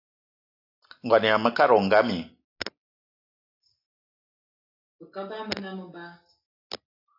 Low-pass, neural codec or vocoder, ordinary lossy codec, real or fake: 5.4 kHz; none; AAC, 48 kbps; real